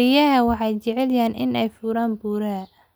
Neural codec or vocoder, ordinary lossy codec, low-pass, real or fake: none; none; none; real